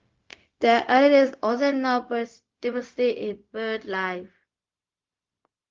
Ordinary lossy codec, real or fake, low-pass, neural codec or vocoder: Opus, 32 kbps; fake; 7.2 kHz; codec, 16 kHz, 0.4 kbps, LongCat-Audio-Codec